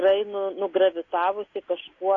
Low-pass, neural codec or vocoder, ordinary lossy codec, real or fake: 7.2 kHz; none; AAC, 32 kbps; real